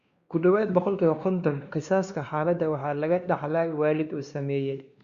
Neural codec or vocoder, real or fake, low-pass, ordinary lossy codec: codec, 16 kHz, 2 kbps, X-Codec, WavLM features, trained on Multilingual LibriSpeech; fake; 7.2 kHz; Opus, 64 kbps